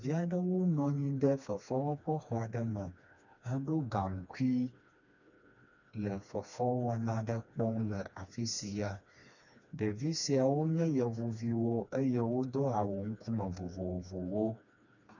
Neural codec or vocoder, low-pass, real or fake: codec, 16 kHz, 2 kbps, FreqCodec, smaller model; 7.2 kHz; fake